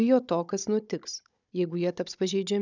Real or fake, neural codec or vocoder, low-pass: fake; codec, 16 kHz, 16 kbps, FunCodec, trained on Chinese and English, 50 frames a second; 7.2 kHz